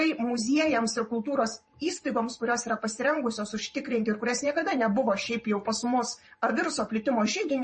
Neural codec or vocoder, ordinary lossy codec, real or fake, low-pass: vocoder, 44.1 kHz, 128 mel bands every 512 samples, BigVGAN v2; MP3, 32 kbps; fake; 10.8 kHz